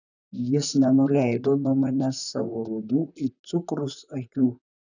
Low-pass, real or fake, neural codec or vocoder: 7.2 kHz; fake; vocoder, 44.1 kHz, 128 mel bands, Pupu-Vocoder